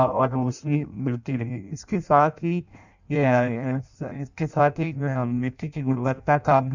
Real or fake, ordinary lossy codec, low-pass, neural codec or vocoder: fake; none; 7.2 kHz; codec, 16 kHz in and 24 kHz out, 0.6 kbps, FireRedTTS-2 codec